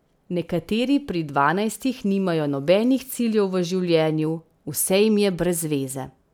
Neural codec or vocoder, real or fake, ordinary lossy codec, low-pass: none; real; none; none